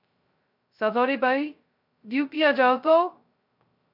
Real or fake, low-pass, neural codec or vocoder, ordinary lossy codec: fake; 5.4 kHz; codec, 16 kHz, 0.2 kbps, FocalCodec; MP3, 48 kbps